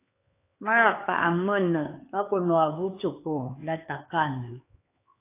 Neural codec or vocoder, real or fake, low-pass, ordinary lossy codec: codec, 16 kHz, 4 kbps, X-Codec, HuBERT features, trained on LibriSpeech; fake; 3.6 kHz; AAC, 24 kbps